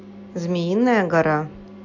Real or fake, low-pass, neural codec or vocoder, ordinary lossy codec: real; 7.2 kHz; none; AAC, 48 kbps